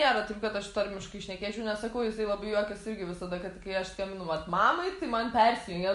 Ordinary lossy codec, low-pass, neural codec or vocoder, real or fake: MP3, 48 kbps; 14.4 kHz; none; real